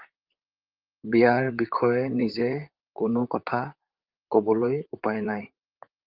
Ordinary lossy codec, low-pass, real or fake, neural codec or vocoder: Opus, 32 kbps; 5.4 kHz; fake; vocoder, 44.1 kHz, 128 mel bands, Pupu-Vocoder